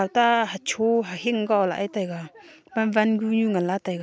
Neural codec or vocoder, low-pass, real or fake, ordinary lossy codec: none; none; real; none